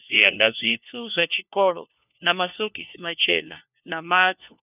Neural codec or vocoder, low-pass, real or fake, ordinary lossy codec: codec, 16 kHz, 1 kbps, FunCodec, trained on LibriTTS, 50 frames a second; 3.6 kHz; fake; none